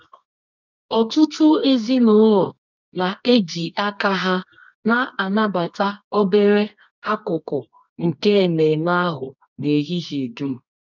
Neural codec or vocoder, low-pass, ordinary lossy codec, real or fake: codec, 24 kHz, 0.9 kbps, WavTokenizer, medium music audio release; 7.2 kHz; none; fake